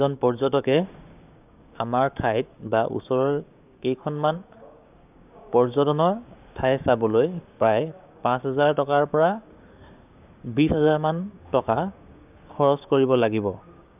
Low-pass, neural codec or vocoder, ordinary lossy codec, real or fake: 3.6 kHz; codec, 16 kHz, 6 kbps, DAC; none; fake